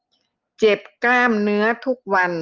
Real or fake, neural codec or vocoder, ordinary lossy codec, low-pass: real; none; Opus, 24 kbps; 7.2 kHz